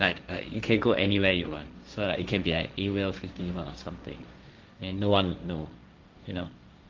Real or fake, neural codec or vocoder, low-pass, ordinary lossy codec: fake; codec, 16 kHz, 1.1 kbps, Voila-Tokenizer; 7.2 kHz; Opus, 24 kbps